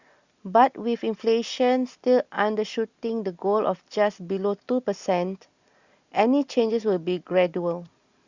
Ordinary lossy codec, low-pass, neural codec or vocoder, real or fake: Opus, 64 kbps; 7.2 kHz; none; real